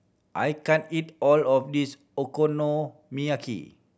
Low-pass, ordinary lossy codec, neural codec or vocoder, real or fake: none; none; none; real